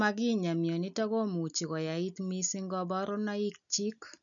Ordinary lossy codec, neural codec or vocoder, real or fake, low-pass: none; none; real; 7.2 kHz